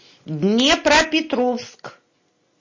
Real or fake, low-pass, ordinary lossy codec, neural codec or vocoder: real; 7.2 kHz; MP3, 32 kbps; none